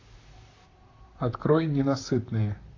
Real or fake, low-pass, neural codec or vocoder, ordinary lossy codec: fake; 7.2 kHz; vocoder, 44.1 kHz, 128 mel bands, Pupu-Vocoder; AAC, 32 kbps